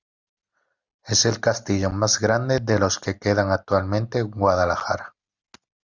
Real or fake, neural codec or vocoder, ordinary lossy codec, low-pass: real; none; Opus, 64 kbps; 7.2 kHz